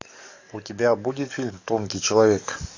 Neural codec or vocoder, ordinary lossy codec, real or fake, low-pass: codec, 44.1 kHz, 7.8 kbps, DAC; AAC, 48 kbps; fake; 7.2 kHz